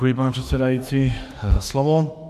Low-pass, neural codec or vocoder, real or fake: 14.4 kHz; autoencoder, 48 kHz, 32 numbers a frame, DAC-VAE, trained on Japanese speech; fake